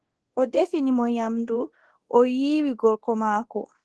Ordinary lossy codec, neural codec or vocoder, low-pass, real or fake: Opus, 16 kbps; codec, 24 kHz, 0.9 kbps, DualCodec; 10.8 kHz; fake